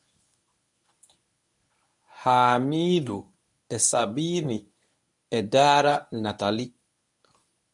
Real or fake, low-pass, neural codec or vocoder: fake; 10.8 kHz; codec, 24 kHz, 0.9 kbps, WavTokenizer, medium speech release version 1